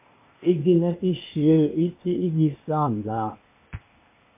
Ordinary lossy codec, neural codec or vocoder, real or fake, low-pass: MP3, 24 kbps; codec, 16 kHz, 0.8 kbps, ZipCodec; fake; 3.6 kHz